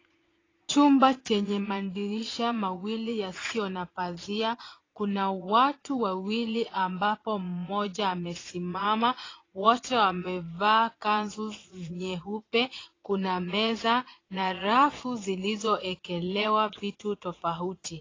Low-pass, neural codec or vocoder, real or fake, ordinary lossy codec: 7.2 kHz; vocoder, 22.05 kHz, 80 mel bands, Vocos; fake; AAC, 32 kbps